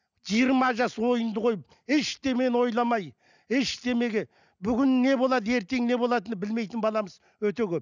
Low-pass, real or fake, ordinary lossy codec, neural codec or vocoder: 7.2 kHz; real; none; none